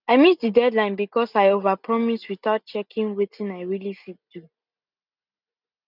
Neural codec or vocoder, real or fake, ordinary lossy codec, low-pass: none; real; none; 5.4 kHz